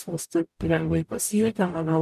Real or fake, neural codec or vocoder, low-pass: fake; codec, 44.1 kHz, 0.9 kbps, DAC; 14.4 kHz